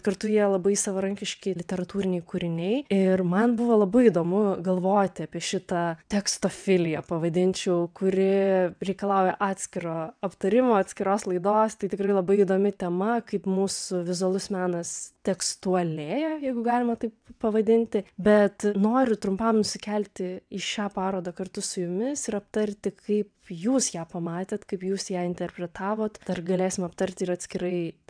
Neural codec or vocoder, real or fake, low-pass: vocoder, 22.05 kHz, 80 mel bands, WaveNeXt; fake; 9.9 kHz